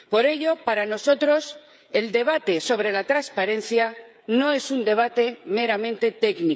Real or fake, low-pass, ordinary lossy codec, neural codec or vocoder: fake; none; none; codec, 16 kHz, 8 kbps, FreqCodec, smaller model